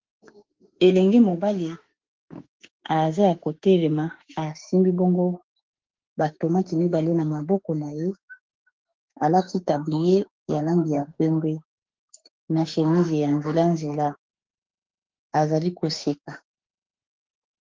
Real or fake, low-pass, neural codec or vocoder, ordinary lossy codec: fake; 7.2 kHz; autoencoder, 48 kHz, 32 numbers a frame, DAC-VAE, trained on Japanese speech; Opus, 16 kbps